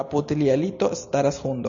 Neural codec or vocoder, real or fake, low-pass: none; real; 7.2 kHz